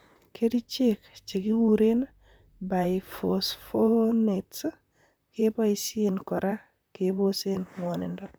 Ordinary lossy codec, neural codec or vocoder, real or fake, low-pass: none; vocoder, 44.1 kHz, 128 mel bands, Pupu-Vocoder; fake; none